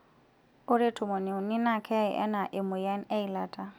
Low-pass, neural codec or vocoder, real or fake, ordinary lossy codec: none; none; real; none